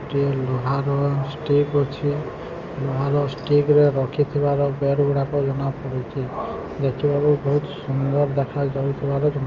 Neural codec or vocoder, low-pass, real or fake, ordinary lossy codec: none; 7.2 kHz; real; Opus, 32 kbps